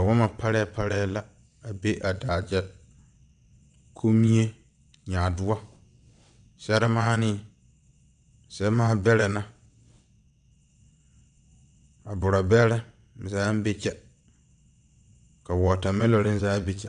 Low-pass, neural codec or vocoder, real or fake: 9.9 kHz; vocoder, 22.05 kHz, 80 mel bands, WaveNeXt; fake